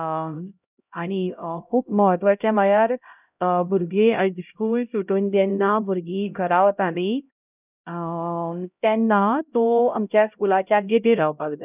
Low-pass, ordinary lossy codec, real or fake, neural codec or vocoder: 3.6 kHz; none; fake; codec, 16 kHz, 0.5 kbps, X-Codec, HuBERT features, trained on LibriSpeech